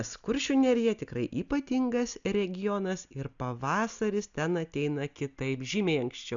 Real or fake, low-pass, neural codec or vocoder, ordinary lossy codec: real; 7.2 kHz; none; MP3, 96 kbps